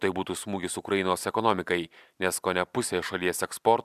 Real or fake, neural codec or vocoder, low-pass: real; none; 14.4 kHz